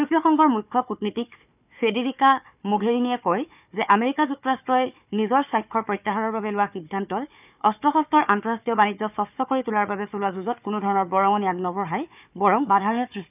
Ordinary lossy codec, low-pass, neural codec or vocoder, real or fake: none; 3.6 kHz; codec, 16 kHz, 4 kbps, FunCodec, trained on Chinese and English, 50 frames a second; fake